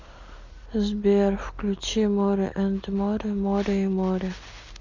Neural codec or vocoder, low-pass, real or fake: none; 7.2 kHz; real